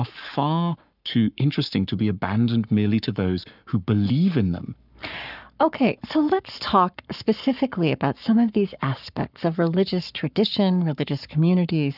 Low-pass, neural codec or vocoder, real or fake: 5.4 kHz; codec, 44.1 kHz, 7.8 kbps, Pupu-Codec; fake